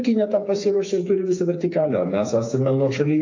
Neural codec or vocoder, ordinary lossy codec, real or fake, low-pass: codec, 16 kHz, 4 kbps, FreqCodec, smaller model; AAC, 48 kbps; fake; 7.2 kHz